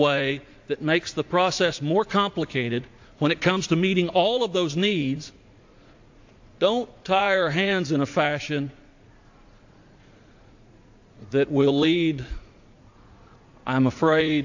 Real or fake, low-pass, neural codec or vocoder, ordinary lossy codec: fake; 7.2 kHz; vocoder, 44.1 kHz, 80 mel bands, Vocos; AAC, 48 kbps